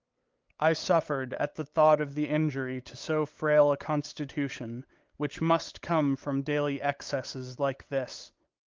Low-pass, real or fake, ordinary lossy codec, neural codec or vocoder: 7.2 kHz; fake; Opus, 32 kbps; codec, 16 kHz, 8 kbps, FunCodec, trained on LibriTTS, 25 frames a second